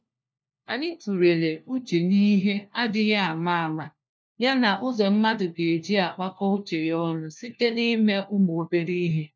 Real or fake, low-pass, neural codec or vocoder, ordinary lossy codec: fake; none; codec, 16 kHz, 1 kbps, FunCodec, trained on LibriTTS, 50 frames a second; none